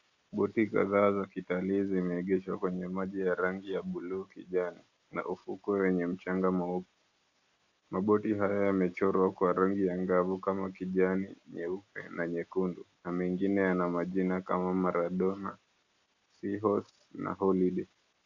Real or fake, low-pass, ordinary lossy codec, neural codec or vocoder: real; 7.2 kHz; AAC, 48 kbps; none